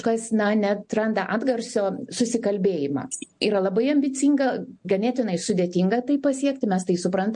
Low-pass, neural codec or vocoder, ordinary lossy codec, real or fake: 9.9 kHz; none; MP3, 48 kbps; real